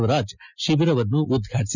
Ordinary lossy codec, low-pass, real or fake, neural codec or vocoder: none; 7.2 kHz; real; none